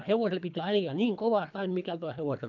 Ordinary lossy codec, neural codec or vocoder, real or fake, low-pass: none; codec, 24 kHz, 3 kbps, HILCodec; fake; 7.2 kHz